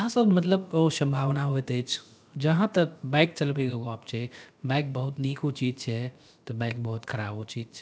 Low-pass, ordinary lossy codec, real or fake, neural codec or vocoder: none; none; fake; codec, 16 kHz, about 1 kbps, DyCAST, with the encoder's durations